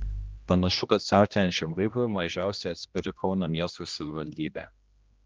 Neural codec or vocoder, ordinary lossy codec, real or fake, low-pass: codec, 16 kHz, 1 kbps, X-Codec, HuBERT features, trained on general audio; Opus, 24 kbps; fake; 7.2 kHz